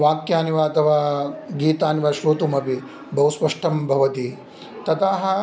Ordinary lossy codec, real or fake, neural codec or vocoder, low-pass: none; real; none; none